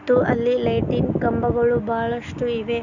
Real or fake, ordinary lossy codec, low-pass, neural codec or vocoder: real; none; 7.2 kHz; none